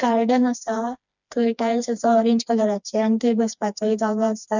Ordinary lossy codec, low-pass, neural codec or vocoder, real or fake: none; 7.2 kHz; codec, 16 kHz, 2 kbps, FreqCodec, smaller model; fake